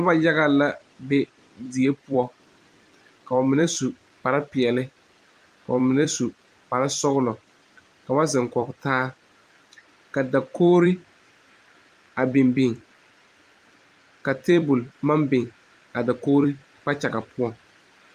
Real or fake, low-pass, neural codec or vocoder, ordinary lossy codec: fake; 14.4 kHz; vocoder, 44.1 kHz, 128 mel bands every 256 samples, BigVGAN v2; Opus, 32 kbps